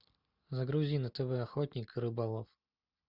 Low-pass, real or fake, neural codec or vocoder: 5.4 kHz; real; none